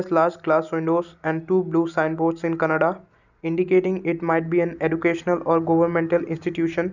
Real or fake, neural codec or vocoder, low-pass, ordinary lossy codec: real; none; 7.2 kHz; none